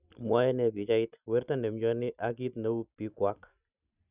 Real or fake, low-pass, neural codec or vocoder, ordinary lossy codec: real; 3.6 kHz; none; none